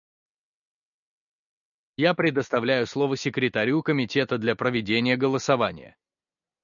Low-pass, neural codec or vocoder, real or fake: 7.2 kHz; none; real